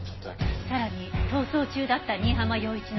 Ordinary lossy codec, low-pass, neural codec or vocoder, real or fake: MP3, 24 kbps; 7.2 kHz; none; real